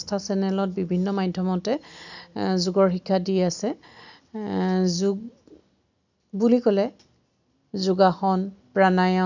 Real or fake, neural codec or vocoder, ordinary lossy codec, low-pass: real; none; none; 7.2 kHz